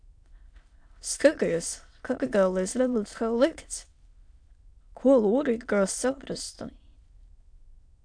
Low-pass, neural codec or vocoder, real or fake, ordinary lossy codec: 9.9 kHz; autoencoder, 22.05 kHz, a latent of 192 numbers a frame, VITS, trained on many speakers; fake; AAC, 64 kbps